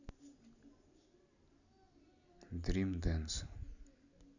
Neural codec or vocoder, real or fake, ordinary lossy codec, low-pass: none; real; none; 7.2 kHz